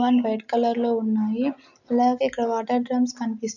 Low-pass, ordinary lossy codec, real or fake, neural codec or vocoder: 7.2 kHz; none; real; none